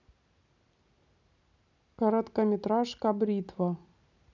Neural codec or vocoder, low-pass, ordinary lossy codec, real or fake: none; 7.2 kHz; none; real